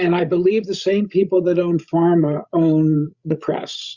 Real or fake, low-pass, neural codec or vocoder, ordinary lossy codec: fake; 7.2 kHz; codec, 16 kHz, 16 kbps, FreqCodec, larger model; Opus, 64 kbps